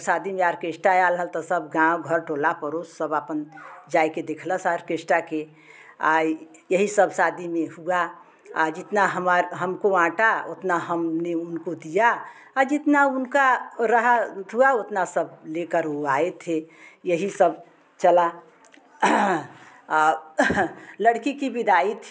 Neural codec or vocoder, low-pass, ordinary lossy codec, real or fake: none; none; none; real